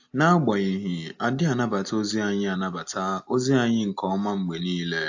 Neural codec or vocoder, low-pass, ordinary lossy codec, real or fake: none; 7.2 kHz; AAC, 48 kbps; real